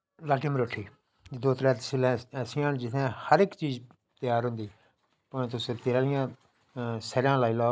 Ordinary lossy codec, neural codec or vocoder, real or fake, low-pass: none; none; real; none